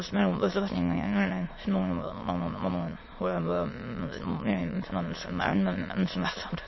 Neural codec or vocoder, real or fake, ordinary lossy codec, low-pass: autoencoder, 22.05 kHz, a latent of 192 numbers a frame, VITS, trained on many speakers; fake; MP3, 24 kbps; 7.2 kHz